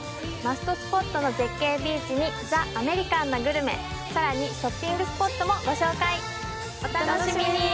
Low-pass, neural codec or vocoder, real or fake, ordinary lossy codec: none; none; real; none